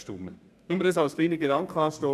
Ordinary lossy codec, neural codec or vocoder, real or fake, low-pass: none; codec, 32 kHz, 1.9 kbps, SNAC; fake; 14.4 kHz